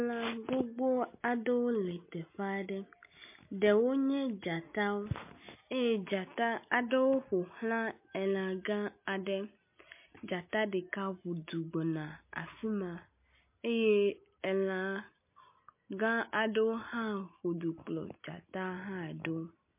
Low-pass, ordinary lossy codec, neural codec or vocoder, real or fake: 3.6 kHz; MP3, 24 kbps; none; real